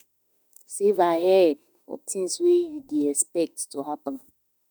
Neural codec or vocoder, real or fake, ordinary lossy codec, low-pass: autoencoder, 48 kHz, 32 numbers a frame, DAC-VAE, trained on Japanese speech; fake; none; none